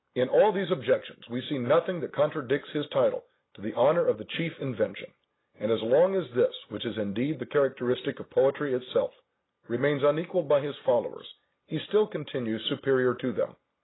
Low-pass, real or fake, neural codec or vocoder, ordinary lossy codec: 7.2 kHz; real; none; AAC, 16 kbps